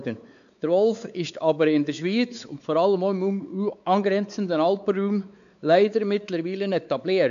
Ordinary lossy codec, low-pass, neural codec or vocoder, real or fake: none; 7.2 kHz; codec, 16 kHz, 4 kbps, X-Codec, WavLM features, trained on Multilingual LibriSpeech; fake